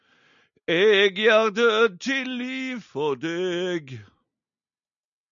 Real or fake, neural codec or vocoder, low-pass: real; none; 7.2 kHz